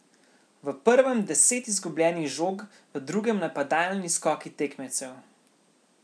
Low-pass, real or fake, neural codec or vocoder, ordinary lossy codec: none; real; none; none